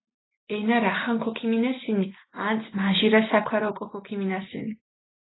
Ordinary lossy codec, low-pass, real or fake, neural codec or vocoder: AAC, 16 kbps; 7.2 kHz; real; none